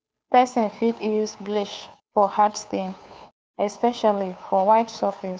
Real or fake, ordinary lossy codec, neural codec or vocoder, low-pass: fake; none; codec, 16 kHz, 2 kbps, FunCodec, trained on Chinese and English, 25 frames a second; none